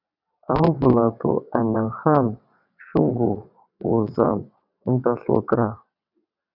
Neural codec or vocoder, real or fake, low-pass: vocoder, 22.05 kHz, 80 mel bands, WaveNeXt; fake; 5.4 kHz